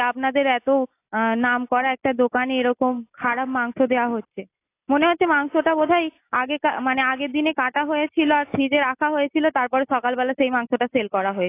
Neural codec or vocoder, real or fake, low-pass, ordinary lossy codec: none; real; 3.6 kHz; AAC, 24 kbps